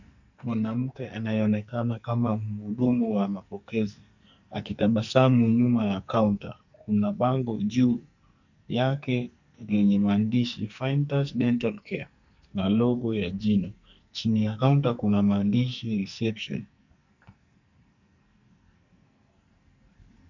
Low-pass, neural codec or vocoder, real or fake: 7.2 kHz; codec, 44.1 kHz, 2.6 kbps, SNAC; fake